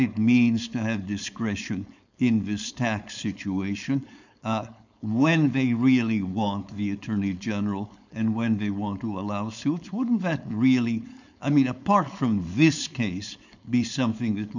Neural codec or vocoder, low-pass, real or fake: codec, 16 kHz, 4.8 kbps, FACodec; 7.2 kHz; fake